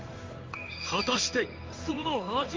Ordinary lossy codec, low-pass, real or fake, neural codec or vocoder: Opus, 32 kbps; 7.2 kHz; fake; codec, 16 kHz in and 24 kHz out, 1 kbps, XY-Tokenizer